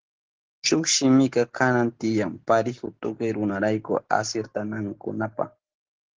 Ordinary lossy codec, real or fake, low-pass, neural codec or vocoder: Opus, 16 kbps; real; 7.2 kHz; none